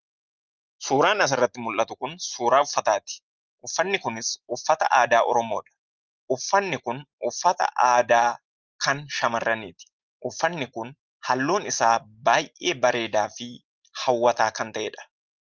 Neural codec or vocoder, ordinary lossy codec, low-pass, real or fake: none; Opus, 24 kbps; 7.2 kHz; real